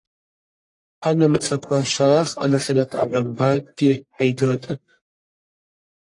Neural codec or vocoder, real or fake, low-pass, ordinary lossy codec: codec, 44.1 kHz, 1.7 kbps, Pupu-Codec; fake; 10.8 kHz; AAC, 48 kbps